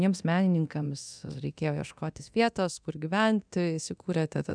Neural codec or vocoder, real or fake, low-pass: codec, 24 kHz, 0.9 kbps, DualCodec; fake; 9.9 kHz